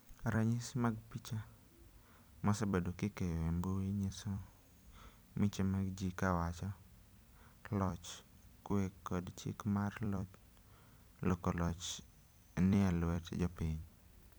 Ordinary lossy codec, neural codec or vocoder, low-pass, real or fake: none; vocoder, 44.1 kHz, 128 mel bands every 256 samples, BigVGAN v2; none; fake